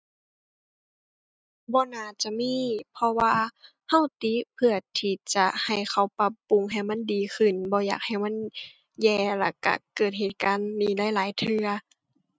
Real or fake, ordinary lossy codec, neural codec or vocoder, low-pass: real; none; none; none